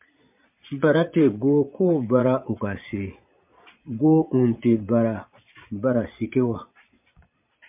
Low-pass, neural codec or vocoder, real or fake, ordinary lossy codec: 3.6 kHz; vocoder, 22.05 kHz, 80 mel bands, Vocos; fake; MP3, 32 kbps